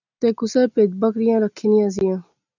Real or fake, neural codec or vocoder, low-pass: real; none; 7.2 kHz